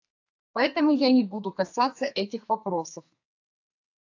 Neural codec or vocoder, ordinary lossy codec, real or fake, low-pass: codec, 32 kHz, 1.9 kbps, SNAC; AAC, 48 kbps; fake; 7.2 kHz